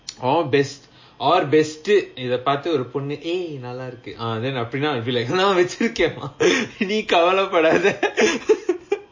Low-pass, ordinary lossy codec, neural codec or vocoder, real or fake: 7.2 kHz; MP3, 32 kbps; none; real